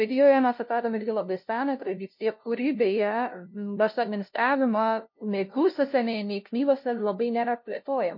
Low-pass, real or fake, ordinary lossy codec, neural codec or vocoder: 5.4 kHz; fake; MP3, 32 kbps; codec, 16 kHz, 0.5 kbps, FunCodec, trained on LibriTTS, 25 frames a second